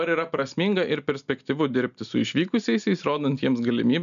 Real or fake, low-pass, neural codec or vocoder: real; 7.2 kHz; none